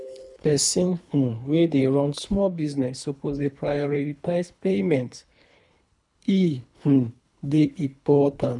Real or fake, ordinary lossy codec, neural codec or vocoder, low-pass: fake; none; codec, 24 kHz, 3 kbps, HILCodec; 10.8 kHz